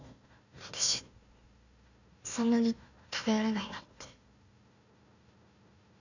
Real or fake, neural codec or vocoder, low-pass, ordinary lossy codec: fake; codec, 16 kHz, 1 kbps, FunCodec, trained on Chinese and English, 50 frames a second; 7.2 kHz; none